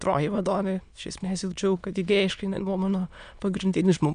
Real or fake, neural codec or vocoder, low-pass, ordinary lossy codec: fake; autoencoder, 22.05 kHz, a latent of 192 numbers a frame, VITS, trained on many speakers; 9.9 kHz; MP3, 96 kbps